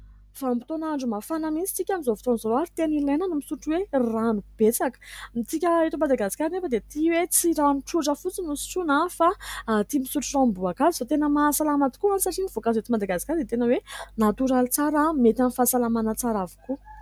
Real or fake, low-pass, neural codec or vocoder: real; 19.8 kHz; none